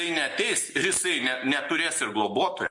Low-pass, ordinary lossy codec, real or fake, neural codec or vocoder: 10.8 kHz; MP3, 48 kbps; real; none